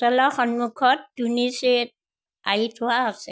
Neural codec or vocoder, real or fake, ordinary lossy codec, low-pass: none; real; none; none